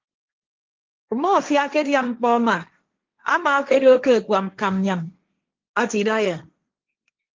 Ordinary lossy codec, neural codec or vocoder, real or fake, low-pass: Opus, 32 kbps; codec, 16 kHz, 1.1 kbps, Voila-Tokenizer; fake; 7.2 kHz